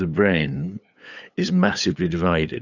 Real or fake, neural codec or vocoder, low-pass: fake; codec, 16 kHz, 8 kbps, FunCodec, trained on LibriTTS, 25 frames a second; 7.2 kHz